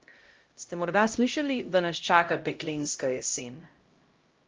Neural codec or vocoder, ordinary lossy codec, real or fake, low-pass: codec, 16 kHz, 0.5 kbps, X-Codec, HuBERT features, trained on LibriSpeech; Opus, 32 kbps; fake; 7.2 kHz